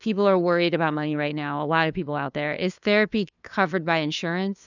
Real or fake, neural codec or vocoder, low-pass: fake; codec, 16 kHz, 2 kbps, FunCodec, trained on LibriTTS, 25 frames a second; 7.2 kHz